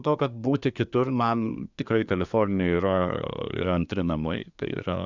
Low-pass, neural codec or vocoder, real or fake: 7.2 kHz; codec, 24 kHz, 1 kbps, SNAC; fake